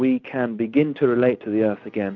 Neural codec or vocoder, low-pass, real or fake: none; 7.2 kHz; real